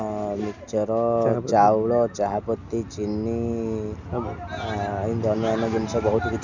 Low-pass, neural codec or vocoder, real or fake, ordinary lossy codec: 7.2 kHz; none; real; none